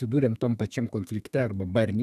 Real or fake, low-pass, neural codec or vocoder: fake; 14.4 kHz; codec, 32 kHz, 1.9 kbps, SNAC